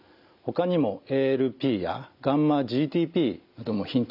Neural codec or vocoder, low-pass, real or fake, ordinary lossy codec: none; 5.4 kHz; real; AAC, 48 kbps